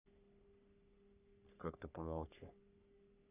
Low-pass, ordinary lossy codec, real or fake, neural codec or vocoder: 3.6 kHz; none; fake; codec, 44.1 kHz, 3.4 kbps, Pupu-Codec